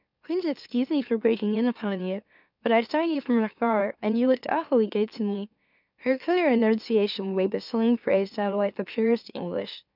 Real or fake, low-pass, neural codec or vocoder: fake; 5.4 kHz; autoencoder, 44.1 kHz, a latent of 192 numbers a frame, MeloTTS